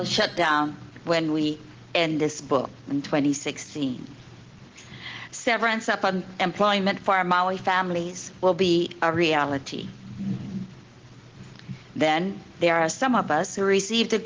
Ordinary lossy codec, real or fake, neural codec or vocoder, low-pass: Opus, 16 kbps; real; none; 7.2 kHz